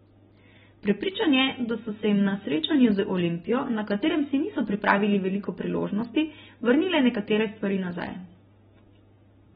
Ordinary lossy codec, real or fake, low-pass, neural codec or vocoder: AAC, 16 kbps; real; 10.8 kHz; none